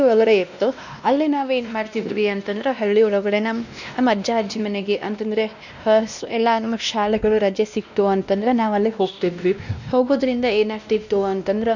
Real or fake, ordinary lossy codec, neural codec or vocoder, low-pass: fake; none; codec, 16 kHz, 1 kbps, X-Codec, WavLM features, trained on Multilingual LibriSpeech; 7.2 kHz